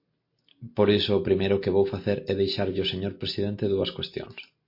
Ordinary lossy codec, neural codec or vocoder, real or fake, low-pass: MP3, 32 kbps; none; real; 5.4 kHz